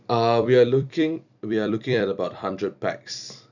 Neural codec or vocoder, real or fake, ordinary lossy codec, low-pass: vocoder, 44.1 kHz, 128 mel bands every 256 samples, BigVGAN v2; fake; none; 7.2 kHz